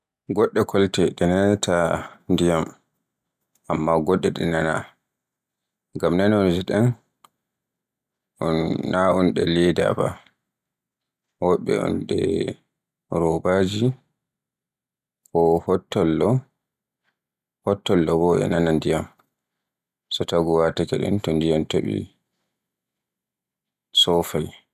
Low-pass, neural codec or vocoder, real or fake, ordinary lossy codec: 14.4 kHz; none; real; none